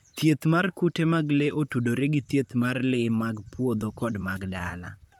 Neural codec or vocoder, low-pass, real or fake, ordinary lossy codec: vocoder, 44.1 kHz, 128 mel bands, Pupu-Vocoder; 19.8 kHz; fake; MP3, 96 kbps